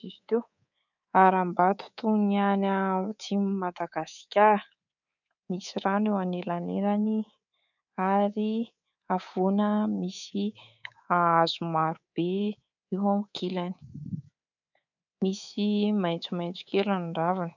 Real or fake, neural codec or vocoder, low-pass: fake; codec, 24 kHz, 3.1 kbps, DualCodec; 7.2 kHz